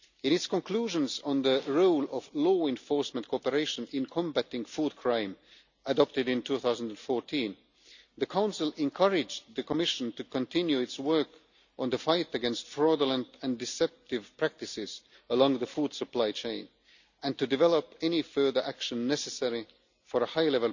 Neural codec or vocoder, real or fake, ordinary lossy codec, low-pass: none; real; none; 7.2 kHz